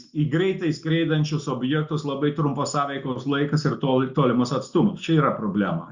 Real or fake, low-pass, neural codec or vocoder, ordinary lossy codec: real; 7.2 kHz; none; Opus, 64 kbps